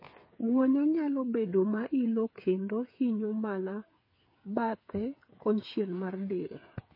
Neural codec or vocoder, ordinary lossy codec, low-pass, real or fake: codec, 16 kHz, 8 kbps, FreqCodec, smaller model; MP3, 24 kbps; 5.4 kHz; fake